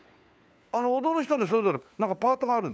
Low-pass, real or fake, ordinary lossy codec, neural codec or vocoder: none; fake; none; codec, 16 kHz, 4 kbps, FunCodec, trained on LibriTTS, 50 frames a second